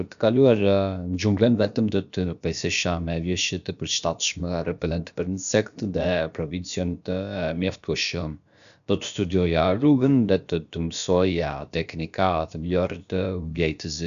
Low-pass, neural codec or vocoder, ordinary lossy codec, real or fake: 7.2 kHz; codec, 16 kHz, about 1 kbps, DyCAST, with the encoder's durations; none; fake